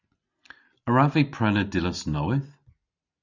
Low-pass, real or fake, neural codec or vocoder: 7.2 kHz; real; none